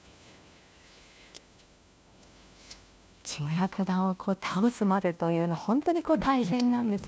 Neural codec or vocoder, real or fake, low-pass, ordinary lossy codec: codec, 16 kHz, 1 kbps, FunCodec, trained on LibriTTS, 50 frames a second; fake; none; none